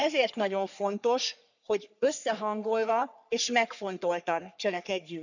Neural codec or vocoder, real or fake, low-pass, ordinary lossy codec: codec, 44.1 kHz, 3.4 kbps, Pupu-Codec; fake; 7.2 kHz; none